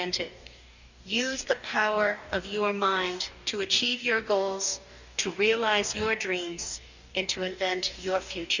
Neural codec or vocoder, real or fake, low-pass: codec, 44.1 kHz, 2.6 kbps, DAC; fake; 7.2 kHz